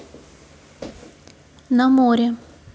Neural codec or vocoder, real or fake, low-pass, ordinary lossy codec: none; real; none; none